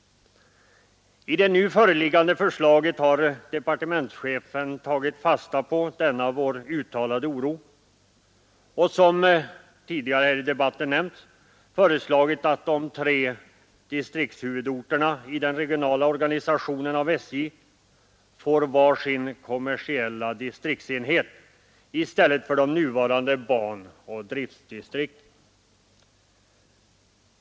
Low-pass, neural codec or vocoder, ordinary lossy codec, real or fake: none; none; none; real